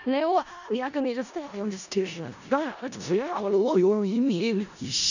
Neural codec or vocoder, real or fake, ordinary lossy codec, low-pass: codec, 16 kHz in and 24 kHz out, 0.4 kbps, LongCat-Audio-Codec, four codebook decoder; fake; none; 7.2 kHz